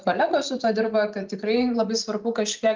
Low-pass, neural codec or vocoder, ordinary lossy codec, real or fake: 7.2 kHz; none; Opus, 24 kbps; real